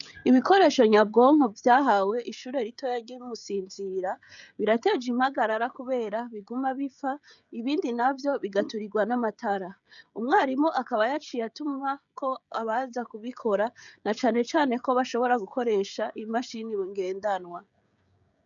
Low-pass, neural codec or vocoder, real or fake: 7.2 kHz; codec, 16 kHz, 16 kbps, FreqCodec, smaller model; fake